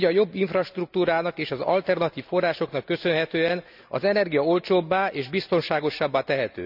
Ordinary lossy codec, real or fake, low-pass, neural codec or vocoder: none; real; 5.4 kHz; none